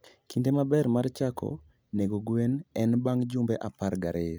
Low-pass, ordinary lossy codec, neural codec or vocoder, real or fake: none; none; none; real